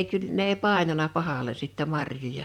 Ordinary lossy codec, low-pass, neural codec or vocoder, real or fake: none; 19.8 kHz; vocoder, 44.1 kHz, 128 mel bands, Pupu-Vocoder; fake